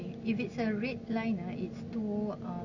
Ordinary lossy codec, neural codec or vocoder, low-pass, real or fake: none; none; 7.2 kHz; real